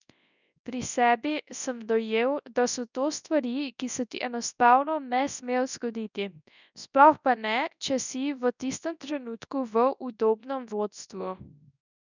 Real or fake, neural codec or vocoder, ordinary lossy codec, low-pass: fake; codec, 24 kHz, 0.9 kbps, WavTokenizer, large speech release; none; 7.2 kHz